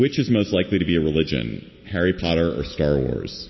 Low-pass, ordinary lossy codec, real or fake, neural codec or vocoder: 7.2 kHz; MP3, 24 kbps; real; none